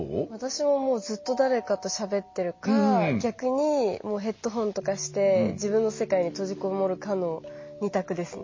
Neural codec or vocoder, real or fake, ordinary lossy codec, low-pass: none; real; MP3, 32 kbps; 7.2 kHz